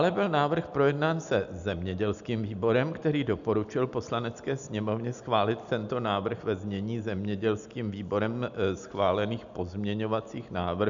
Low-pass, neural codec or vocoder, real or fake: 7.2 kHz; none; real